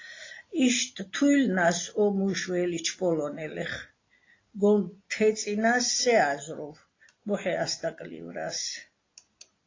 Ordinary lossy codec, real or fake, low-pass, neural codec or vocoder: AAC, 32 kbps; real; 7.2 kHz; none